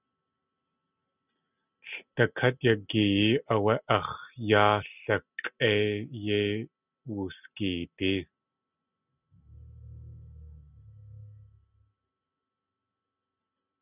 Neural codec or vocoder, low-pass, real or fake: none; 3.6 kHz; real